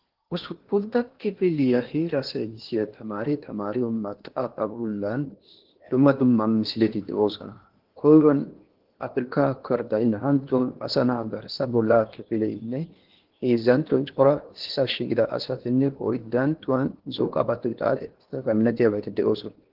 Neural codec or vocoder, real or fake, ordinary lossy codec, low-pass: codec, 16 kHz in and 24 kHz out, 0.8 kbps, FocalCodec, streaming, 65536 codes; fake; Opus, 16 kbps; 5.4 kHz